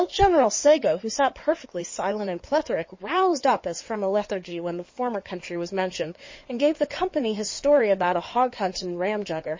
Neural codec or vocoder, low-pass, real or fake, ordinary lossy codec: codec, 16 kHz in and 24 kHz out, 2.2 kbps, FireRedTTS-2 codec; 7.2 kHz; fake; MP3, 32 kbps